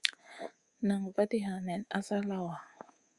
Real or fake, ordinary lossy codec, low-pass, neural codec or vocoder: fake; Opus, 64 kbps; 10.8 kHz; codec, 24 kHz, 3.1 kbps, DualCodec